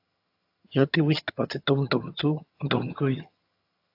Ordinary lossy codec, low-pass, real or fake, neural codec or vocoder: AAC, 32 kbps; 5.4 kHz; fake; vocoder, 22.05 kHz, 80 mel bands, HiFi-GAN